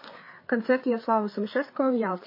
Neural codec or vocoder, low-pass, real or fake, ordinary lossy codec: codec, 16 kHz, 4 kbps, FreqCodec, larger model; 5.4 kHz; fake; MP3, 24 kbps